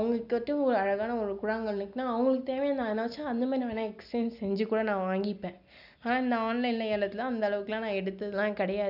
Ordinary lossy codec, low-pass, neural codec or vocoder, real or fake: none; 5.4 kHz; none; real